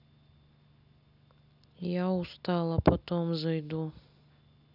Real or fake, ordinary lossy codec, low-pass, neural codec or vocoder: real; none; 5.4 kHz; none